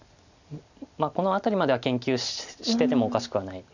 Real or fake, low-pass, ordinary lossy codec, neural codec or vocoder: real; 7.2 kHz; none; none